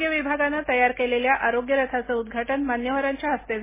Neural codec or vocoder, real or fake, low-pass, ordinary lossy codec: none; real; 3.6 kHz; MP3, 16 kbps